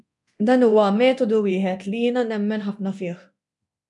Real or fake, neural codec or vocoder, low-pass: fake; codec, 24 kHz, 0.9 kbps, DualCodec; 10.8 kHz